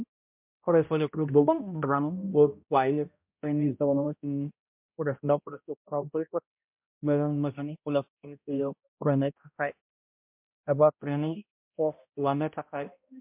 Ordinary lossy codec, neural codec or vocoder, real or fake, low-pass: MP3, 32 kbps; codec, 16 kHz, 0.5 kbps, X-Codec, HuBERT features, trained on balanced general audio; fake; 3.6 kHz